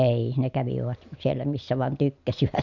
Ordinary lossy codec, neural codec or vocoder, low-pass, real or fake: none; none; 7.2 kHz; real